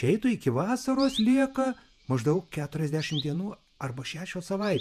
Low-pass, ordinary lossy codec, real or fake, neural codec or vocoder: 14.4 kHz; MP3, 96 kbps; fake; vocoder, 48 kHz, 128 mel bands, Vocos